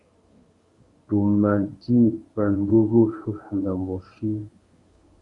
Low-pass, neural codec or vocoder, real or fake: 10.8 kHz; codec, 24 kHz, 0.9 kbps, WavTokenizer, medium speech release version 1; fake